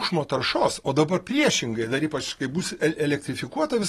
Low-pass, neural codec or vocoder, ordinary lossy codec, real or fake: 19.8 kHz; none; AAC, 32 kbps; real